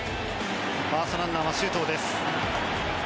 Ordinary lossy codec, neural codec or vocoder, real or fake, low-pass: none; none; real; none